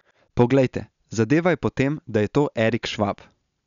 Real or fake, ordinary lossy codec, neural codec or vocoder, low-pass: real; none; none; 7.2 kHz